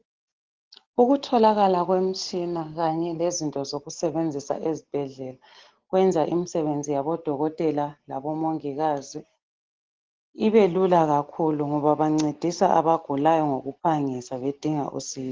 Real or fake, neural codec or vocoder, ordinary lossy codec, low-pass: real; none; Opus, 16 kbps; 7.2 kHz